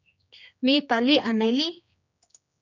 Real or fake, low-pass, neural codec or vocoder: fake; 7.2 kHz; codec, 16 kHz, 2 kbps, X-Codec, HuBERT features, trained on general audio